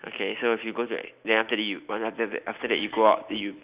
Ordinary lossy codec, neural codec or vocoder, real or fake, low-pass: Opus, 64 kbps; none; real; 3.6 kHz